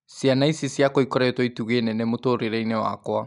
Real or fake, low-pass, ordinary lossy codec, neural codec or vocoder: real; 10.8 kHz; none; none